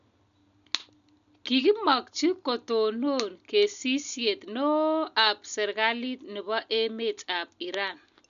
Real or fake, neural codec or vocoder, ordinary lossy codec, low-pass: real; none; none; 7.2 kHz